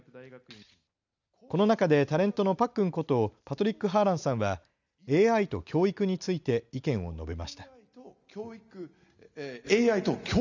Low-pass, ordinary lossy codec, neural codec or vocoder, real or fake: 7.2 kHz; none; none; real